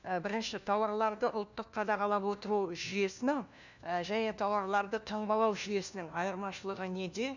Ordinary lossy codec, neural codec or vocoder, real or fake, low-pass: none; codec, 16 kHz, 1 kbps, FunCodec, trained on LibriTTS, 50 frames a second; fake; 7.2 kHz